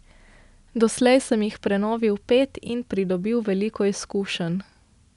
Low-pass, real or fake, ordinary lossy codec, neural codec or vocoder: 10.8 kHz; real; none; none